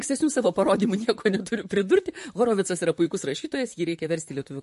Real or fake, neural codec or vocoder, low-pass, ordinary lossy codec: fake; vocoder, 44.1 kHz, 128 mel bands, Pupu-Vocoder; 14.4 kHz; MP3, 48 kbps